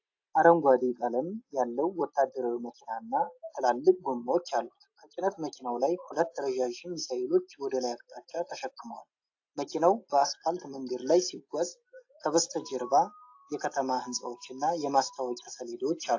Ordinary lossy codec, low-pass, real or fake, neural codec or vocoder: AAC, 48 kbps; 7.2 kHz; real; none